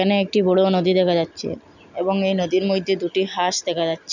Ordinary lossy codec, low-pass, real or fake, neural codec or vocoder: none; 7.2 kHz; real; none